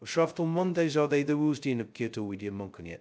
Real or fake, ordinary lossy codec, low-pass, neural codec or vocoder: fake; none; none; codec, 16 kHz, 0.2 kbps, FocalCodec